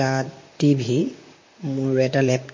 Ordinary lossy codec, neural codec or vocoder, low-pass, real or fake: MP3, 32 kbps; vocoder, 44.1 kHz, 128 mel bands, Pupu-Vocoder; 7.2 kHz; fake